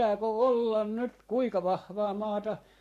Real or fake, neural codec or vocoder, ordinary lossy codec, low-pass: fake; codec, 44.1 kHz, 7.8 kbps, Pupu-Codec; none; 14.4 kHz